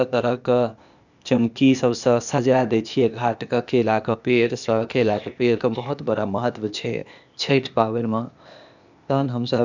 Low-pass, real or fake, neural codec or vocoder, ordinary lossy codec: 7.2 kHz; fake; codec, 16 kHz, 0.8 kbps, ZipCodec; none